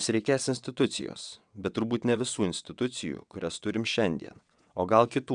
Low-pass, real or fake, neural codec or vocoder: 9.9 kHz; fake; vocoder, 22.05 kHz, 80 mel bands, WaveNeXt